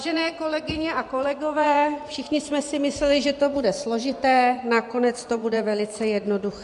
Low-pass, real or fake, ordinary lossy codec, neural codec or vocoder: 10.8 kHz; fake; MP3, 64 kbps; vocoder, 24 kHz, 100 mel bands, Vocos